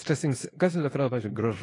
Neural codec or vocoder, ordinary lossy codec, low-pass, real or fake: codec, 24 kHz, 0.9 kbps, WavTokenizer, small release; AAC, 32 kbps; 10.8 kHz; fake